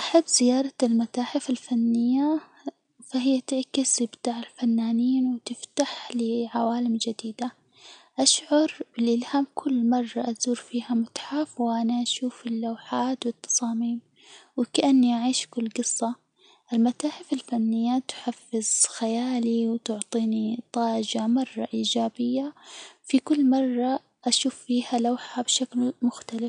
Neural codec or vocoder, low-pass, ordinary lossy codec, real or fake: none; 9.9 kHz; none; real